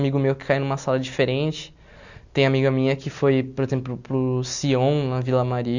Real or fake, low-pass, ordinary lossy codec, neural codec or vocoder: real; 7.2 kHz; Opus, 64 kbps; none